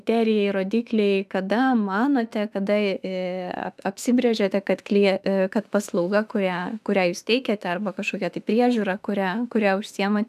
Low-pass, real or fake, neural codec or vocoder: 14.4 kHz; fake; autoencoder, 48 kHz, 32 numbers a frame, DAC-VAE, trained on Japanese speech